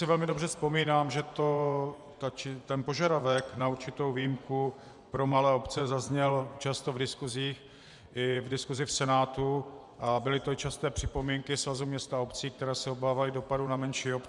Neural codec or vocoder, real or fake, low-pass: vocoder, 24 kHz, 100 mel bands, Vocos; fake; 10.8 kHz